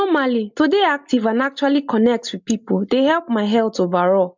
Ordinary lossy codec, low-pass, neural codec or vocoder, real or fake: MP3, 64 kbps; 7.2 kHz; none; real